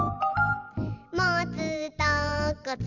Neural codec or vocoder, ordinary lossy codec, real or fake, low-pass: vocoder, 44.1 kHz, 128 mel bands every 512 samples, BigVGAN v2; none; fake; 7.2 kHz